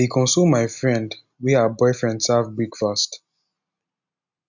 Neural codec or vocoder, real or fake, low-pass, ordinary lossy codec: none; real; 7.2 kHz; none